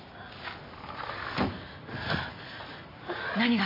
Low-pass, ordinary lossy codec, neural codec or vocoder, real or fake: 5.4 kHz; MP3, 24 kbps; none; real